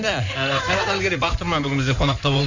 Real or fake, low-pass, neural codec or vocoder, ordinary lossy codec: fake; 7.2 kHz; codec, 16 kHz in and 24 kHz out, 2.2 kbps, FireRedTTS-2 codec; none